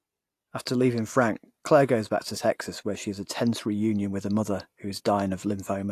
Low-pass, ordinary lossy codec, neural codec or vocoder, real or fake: 14.4 kHz; AAC, 64 kbps; none; real